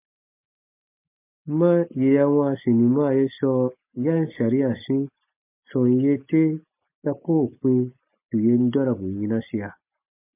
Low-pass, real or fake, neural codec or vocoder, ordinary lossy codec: 3.6 kHz; real; none; MP3, 24 kbps